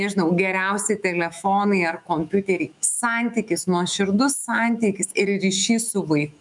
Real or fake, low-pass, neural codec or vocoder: fake; 10.8 kHz; autoencoder, 48 kHz, 128 numbers a frame, DAC-VAE, trained on Japanese speech